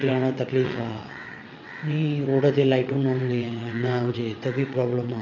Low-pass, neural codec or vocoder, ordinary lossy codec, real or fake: 7.2 kHz; vocoder, 22.05 kHz, 80 mel bands, WaveNeXt; none; fake